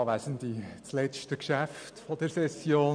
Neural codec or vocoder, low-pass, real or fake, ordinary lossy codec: none; 9.9 kHz; real; none